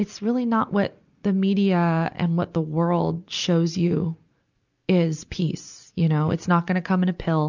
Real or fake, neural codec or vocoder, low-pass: real; none; 7.2 kHz